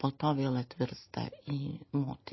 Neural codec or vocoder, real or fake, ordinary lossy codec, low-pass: none; real; MP3, 24 kbps; 7.2 kHz